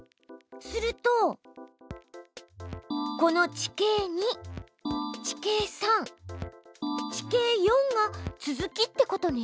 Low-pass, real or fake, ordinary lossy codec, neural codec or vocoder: none; real; none; none